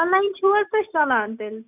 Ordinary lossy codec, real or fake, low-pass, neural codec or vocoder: none; real; 3.6 kHz; none